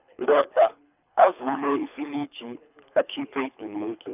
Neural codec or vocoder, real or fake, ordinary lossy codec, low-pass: codec, 24 kHz, 3 kbps, HILCodec; fake; none; 3.6 kHz